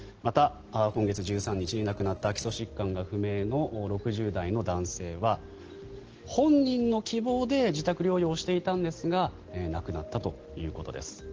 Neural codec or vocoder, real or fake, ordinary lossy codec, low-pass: none; real; Opus, 16 kbps; 7.2 kHz